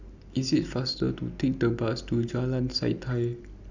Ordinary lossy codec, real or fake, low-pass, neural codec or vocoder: none; real; 7.2 kHz; none